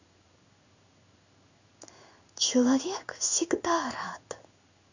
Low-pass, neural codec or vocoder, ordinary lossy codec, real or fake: 7.2 kHz; codec, 16 kHz in and 24 kHz out, 1 kbps, XY-Tokenizer; none; fake